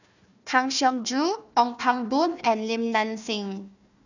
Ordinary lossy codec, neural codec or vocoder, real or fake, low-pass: none; codec, 16 kHz, 1 kbps, FunCodec, trained on Chinese and English, 50 frames a second; fake; 7.2 kHz